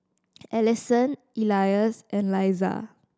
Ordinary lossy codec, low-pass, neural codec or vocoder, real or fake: none; none; none; real